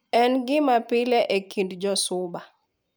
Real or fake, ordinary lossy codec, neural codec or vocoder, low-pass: real; none; none; none